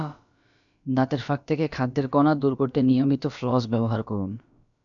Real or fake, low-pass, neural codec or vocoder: fake; 7.2 kHz; codec, 16 kHz, about 1 kbps, DyCAST, with the encoder's durations